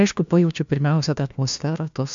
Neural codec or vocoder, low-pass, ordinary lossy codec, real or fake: codec, 16 kHz, 1 kbps, FunCodec, trained on LibriTTS, 50 frames a second; 7.2 kHz; MP3, 64 kbps; fake